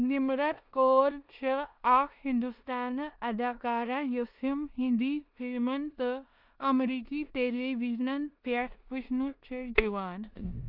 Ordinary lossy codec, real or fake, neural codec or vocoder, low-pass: none; fake; codec, 16 kHz in and 24 kHz out, 0.9 kbps, LongCat-Audio-Codec, four codebook decoder; 5.4 kHz